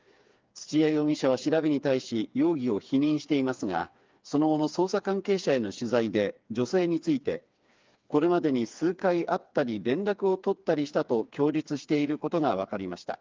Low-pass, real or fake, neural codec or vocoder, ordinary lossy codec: 7.2 kHz; fake; codec, 16 kHz, 4 kbps, FreqCodec, smaller model; Opus, 24 kbps